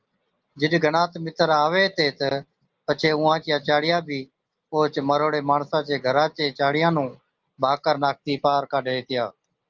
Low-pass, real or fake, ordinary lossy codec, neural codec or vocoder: 7.2 kHz; real; Opus, 24 kbps; none